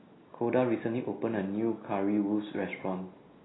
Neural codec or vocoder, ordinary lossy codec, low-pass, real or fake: none; AAC, 16 kbps; 7.2 kHz; real